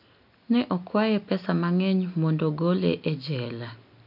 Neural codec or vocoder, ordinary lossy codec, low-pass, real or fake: none; none; 5.4 kHz; real